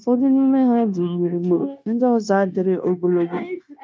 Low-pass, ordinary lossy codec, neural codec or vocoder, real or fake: none; none; codec, 16 kHz, 0.9 kbps, LongCat-Audio-Codec; fake